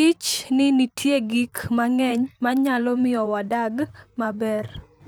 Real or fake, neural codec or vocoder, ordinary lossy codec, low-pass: fake; vocoder, 44.1 kHz, 128 mel bands, Pupu-Vocoder; none; none